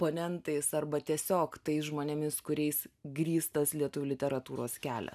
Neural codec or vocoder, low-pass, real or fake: none; 14.4 kHz; real